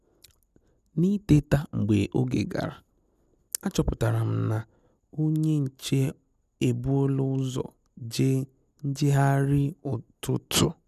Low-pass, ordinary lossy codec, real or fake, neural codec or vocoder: 14.4 kHz; none; real; none